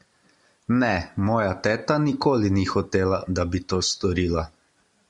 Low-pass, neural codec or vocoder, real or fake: 10.8 kHz; none; real